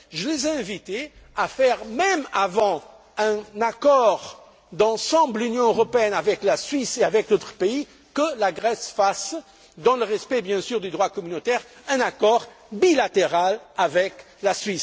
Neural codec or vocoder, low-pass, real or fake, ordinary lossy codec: none; none; real; none